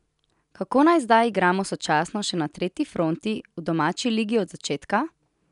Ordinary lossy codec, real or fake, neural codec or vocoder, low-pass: none; real; none; 10.8 kHz